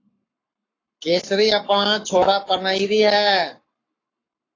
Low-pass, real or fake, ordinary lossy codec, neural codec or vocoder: 7.2 kHz; fake; AAC, 32 kbps; codec, 44.1 kHz, 7.8 kbps, Pupu-Codec